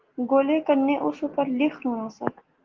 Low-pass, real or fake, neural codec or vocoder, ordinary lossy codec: 7.2 kHz; real; none; Opus, 16 kbps